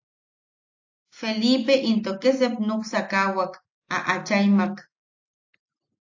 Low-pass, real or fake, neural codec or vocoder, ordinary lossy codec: 7.2 kHz; real; none; AAC, 48 kbps